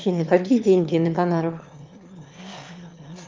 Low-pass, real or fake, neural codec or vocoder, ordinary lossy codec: 7.2 kHz; fake; autoencoder, 22.05 kHz, a latent of 192 numbers a frame, VITS, trained on one speaker; Opus, 32 kbps